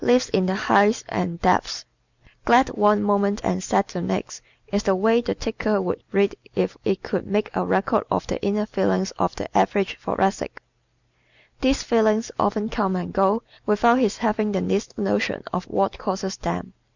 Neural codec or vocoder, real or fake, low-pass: none; real; 7.2 kHz